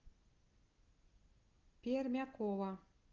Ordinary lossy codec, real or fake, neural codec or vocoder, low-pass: Opus, 32 kbps; real; none; 7.2 kHz